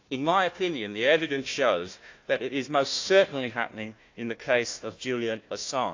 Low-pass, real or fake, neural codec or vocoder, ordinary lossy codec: 7.2 kHz; fake; codec, 16 kHz, 1 kbps, FunCodec, trained on Chinese and English, 50 frames a second; AAC, 48 kbps